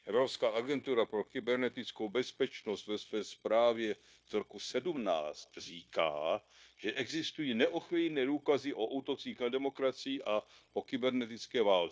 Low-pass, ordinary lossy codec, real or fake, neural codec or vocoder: none; none; fake; codec, 16 kHz, 0.9 kbps, LongCat-Audio-Codec